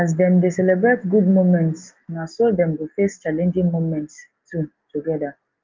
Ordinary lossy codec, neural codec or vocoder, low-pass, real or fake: Opus, 32 kbps; none; 7.2 kHz; real